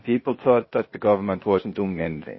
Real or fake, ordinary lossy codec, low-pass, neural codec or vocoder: fake; MP3, 24 kbps; 7.2 kHz; codec, 16 kHz, 0.8 kbps, ZipCodec